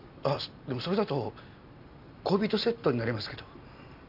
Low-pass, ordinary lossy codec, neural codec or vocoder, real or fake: 5.4 kHz; none; none; real